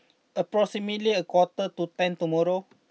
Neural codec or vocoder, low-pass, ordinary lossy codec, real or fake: none; none; none; real